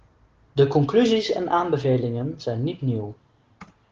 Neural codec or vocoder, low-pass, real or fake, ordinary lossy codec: none; 7.2 kHz; real; Opus, 16 kbps